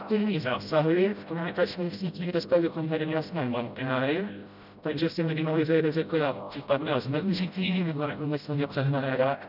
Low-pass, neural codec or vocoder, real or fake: 5.4 kHz; codec, 16 kHz, 0.5 kbps, FreqCodec, smaller model; fake